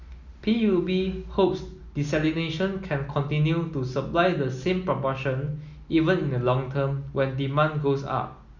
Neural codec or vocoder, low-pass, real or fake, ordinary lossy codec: none; 7.2 kHz; real; Opus, 64 kbps